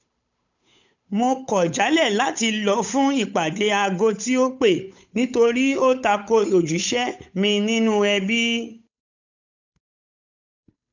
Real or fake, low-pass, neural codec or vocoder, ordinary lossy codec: fake; 7.2 kHz; codec, 16 kHz, 8 kbps, FunCodec, trained on Chinese and English, 25 frames a second; none